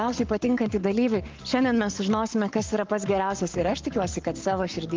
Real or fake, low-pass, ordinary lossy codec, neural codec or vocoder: fake; 7.2 kHz; Opus, 32 kbps; codec, 44.1 kHz, 7.8 kbps, Pupu-Codec